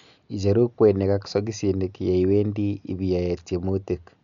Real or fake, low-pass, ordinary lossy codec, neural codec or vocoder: real; 7.2 kHz; none; none